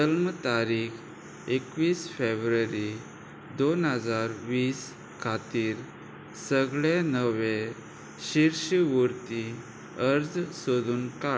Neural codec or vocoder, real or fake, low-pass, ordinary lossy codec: none; real; none; none